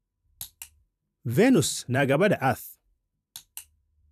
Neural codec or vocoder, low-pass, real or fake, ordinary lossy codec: vocoder, 44.1 kHz, 128 mel bands every 256 samples, BigVGAN v2; 14.4 kHz; fake; none